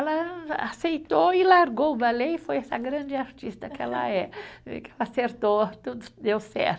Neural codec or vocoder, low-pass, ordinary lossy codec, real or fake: none; none; none; real